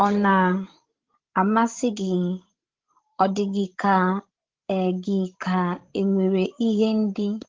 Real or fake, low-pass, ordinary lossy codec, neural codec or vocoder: fake; 7.2 kHz; Opus, 16 kbps; codec, 16 kHz, 16 kbps, FreqCodec, larger model